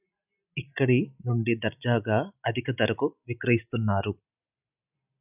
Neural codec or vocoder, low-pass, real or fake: none; 3.6 kHz; real